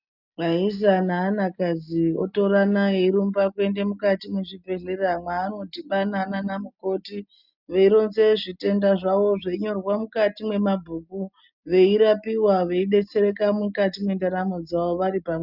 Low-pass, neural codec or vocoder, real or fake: 5.4 kHz; none; real